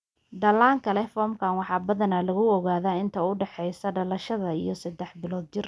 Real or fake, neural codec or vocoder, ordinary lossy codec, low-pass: real; none; none; none